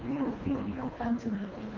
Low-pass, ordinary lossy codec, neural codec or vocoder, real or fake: 7.2 kHz; Opus, 24 kbps; codec, 24 kHz, 1.5 kbps, HILCodec; fake